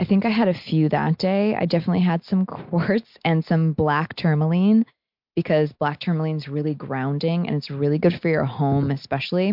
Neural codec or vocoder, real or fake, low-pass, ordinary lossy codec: none; real; 5.4 kHz; MP3, 48 kbps